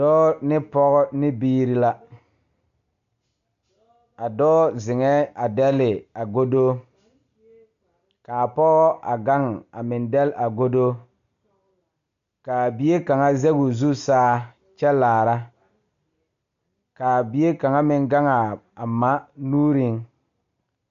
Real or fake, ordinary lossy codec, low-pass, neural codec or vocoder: real; MP3, 96 kbps; 7.2 kHz; none